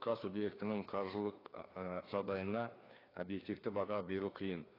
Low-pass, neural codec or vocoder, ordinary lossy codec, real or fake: 5.4 kHz; codec, 16 kHz, 2 kbps, FreqCodec, larger model; AAC, 24 kbps; fake